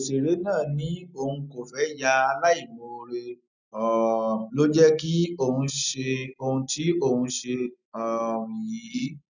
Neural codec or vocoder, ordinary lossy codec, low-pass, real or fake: none; none; 7.2 kHz; real